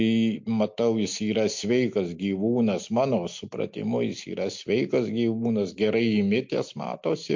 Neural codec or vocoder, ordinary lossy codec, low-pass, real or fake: none; MP3, 48 kbps; 7.2 kHz; real